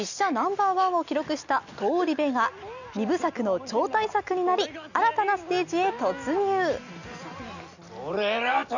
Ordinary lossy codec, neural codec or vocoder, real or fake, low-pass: none; none; real; 7.2 kHz